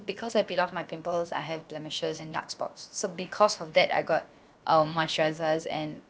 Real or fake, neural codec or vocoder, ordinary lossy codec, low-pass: fake; codec, 16 kHz, about 1 kbps, DyCAST, with the encoder's durations; none; none